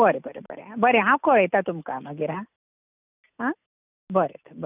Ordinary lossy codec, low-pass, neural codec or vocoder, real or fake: none; 3.6 kHz; none; real